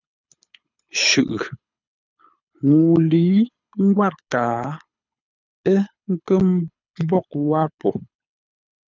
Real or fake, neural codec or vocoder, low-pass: fake; codec, 24 kHz, 6 kbps, HILCodec; 7.2 kHz